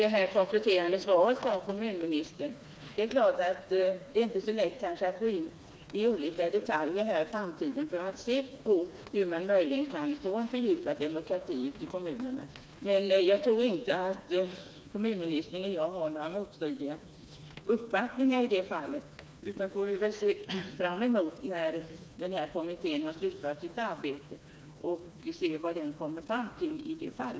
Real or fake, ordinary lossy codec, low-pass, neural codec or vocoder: fake; none; none; codec, 16 kHz, 2 kbps, FreqCodec, smaller model